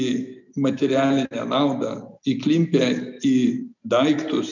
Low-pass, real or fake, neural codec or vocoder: 7.2 kHz; real; none